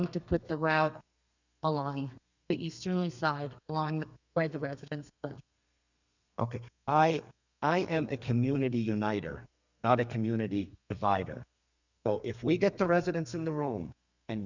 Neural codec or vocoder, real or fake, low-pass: codec, 32 kHz, 1.9 kbps, SNAC; fake; 7.2 kHz